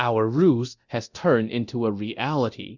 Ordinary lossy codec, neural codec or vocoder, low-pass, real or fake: Opus, 64 kbps; codec, 24 kHz, 0.9 kbps, DualCodec; 7.2 kHz; fake